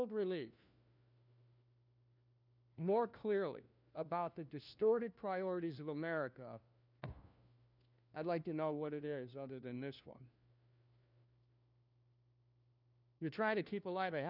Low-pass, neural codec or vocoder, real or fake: 5.4 kHz; codec, 16 kHz, 1 kbps, FunCodec, trained on LibriTTS, 50 frames a second; fake